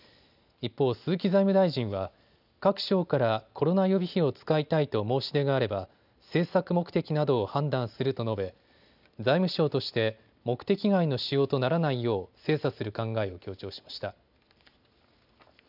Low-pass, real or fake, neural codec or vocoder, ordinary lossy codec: 5.4 kHz; real; none; none